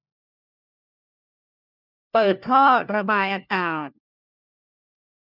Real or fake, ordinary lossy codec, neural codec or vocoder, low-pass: fake; none; codec, 16 kHz, 1 kbps, FunCodec, trained on LibriTTS, 50 frames a second; 5.4 kHz